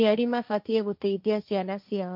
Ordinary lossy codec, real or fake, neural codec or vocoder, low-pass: MP3, 48 kbps; fake; codec, 16 kHz, 1.1 kbps, Voila-Tokenizer; 5.4 kHz